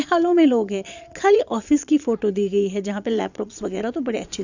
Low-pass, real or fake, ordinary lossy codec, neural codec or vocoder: 7.2 kHz; fake; none; vocoder, 22.05 kHz, 80 mel bands, Vocos